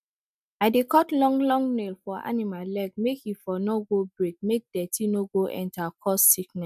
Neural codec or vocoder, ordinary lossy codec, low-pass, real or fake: none; none; 14.4 kHz; real